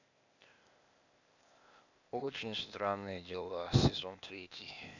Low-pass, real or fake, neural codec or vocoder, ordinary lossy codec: 7.2 kHz; fake; codec, 16 kHz, 0.8 kbps, ZipCodec; none